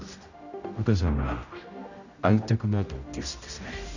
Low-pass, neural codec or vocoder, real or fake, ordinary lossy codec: 7.2 kHz; codec, 16 kHz, 0.5 kbps, X-Codec, HuBERT features, trained on general audio; fake; none